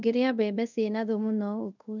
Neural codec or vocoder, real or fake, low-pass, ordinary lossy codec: codec, 24 kHz, 0.5 kbps, DualCodec; fake; 7.2 kHz; none